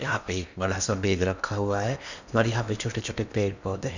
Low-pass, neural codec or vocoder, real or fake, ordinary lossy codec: 7.2 kHz; codec, 16 kHz in and 24 kHz out, 0.8 kbps, FocalCodec, streaming, 65536 codes; fake; none